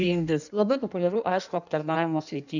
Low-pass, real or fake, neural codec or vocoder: 7.2 kHz; fake; codec, 16 kHz in and 24 kHz out, 1.1 kbps, FireRedTTS-2 codec